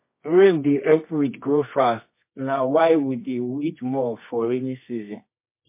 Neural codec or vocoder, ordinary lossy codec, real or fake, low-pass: codec, 24 kHz, 0.9 kbps, WavTokenizer, medium music audio release; MP3, 24 kbps; fake; 3.6 kHz